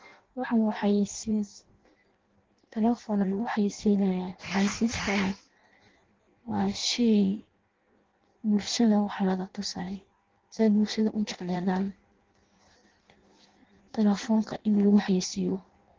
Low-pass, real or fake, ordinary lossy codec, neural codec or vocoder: 7.2 kHz; fake; Opus, 16 kbps; codec, 16 kHz in and 24 kHz out, 0.6 kbps, FireRedTTS-2 codec